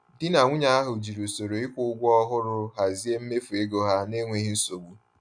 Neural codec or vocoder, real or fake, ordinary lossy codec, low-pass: none; real; none; 9.9 kHz